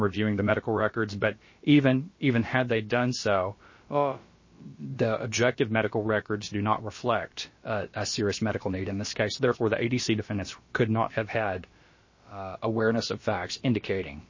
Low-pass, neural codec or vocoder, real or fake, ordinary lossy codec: 7.2 kHz; codec, 16 kHz, about 1 kbps, DyCAST, with the encoder's durations; fake; MP3, 32 kbps